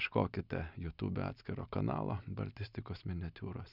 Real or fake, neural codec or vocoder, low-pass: real; none; 5.4 kHz